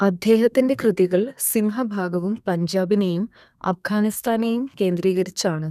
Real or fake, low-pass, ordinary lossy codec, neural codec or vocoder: fake; 14.4 kHz; none; codec, 32 kHz, 1.9 kbps, SNAC